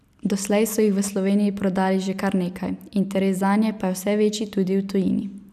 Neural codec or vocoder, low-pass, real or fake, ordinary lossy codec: none; 14.4 kHz; real; none